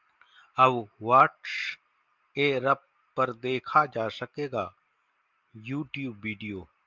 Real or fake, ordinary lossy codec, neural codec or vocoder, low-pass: real; Opus, 24 kbps; none; 7.2 kHz